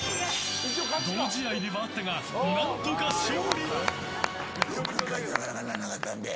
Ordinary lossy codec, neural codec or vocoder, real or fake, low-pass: none; none; real; none